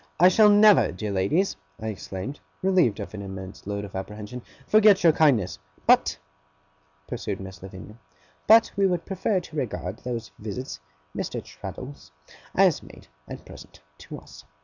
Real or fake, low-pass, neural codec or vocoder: real; 7.2 kHz; none